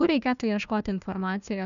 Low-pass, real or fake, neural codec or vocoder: 7.2 kHz; fake; codec, 16 kHz, 1 kbps, FunCodec, trained on Chinese and English, 50 frames a second